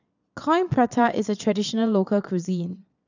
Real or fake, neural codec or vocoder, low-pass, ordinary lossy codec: fake; vocoder, 22.05 kHz, 80 mel bands, Vocos; 7.2 kHz; none